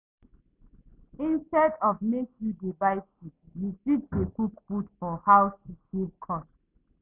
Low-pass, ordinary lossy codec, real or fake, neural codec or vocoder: 3.6 kHz; none; real; none